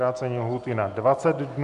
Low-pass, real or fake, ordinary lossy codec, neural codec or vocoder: 10.8 kHz; real; MP3, 64 kbps; none